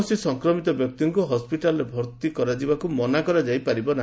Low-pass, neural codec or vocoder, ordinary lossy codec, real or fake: none; none; none; real